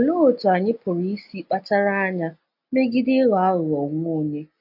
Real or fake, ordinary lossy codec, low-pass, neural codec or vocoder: real; AAC, 48 kbps; 5.4 kHz; none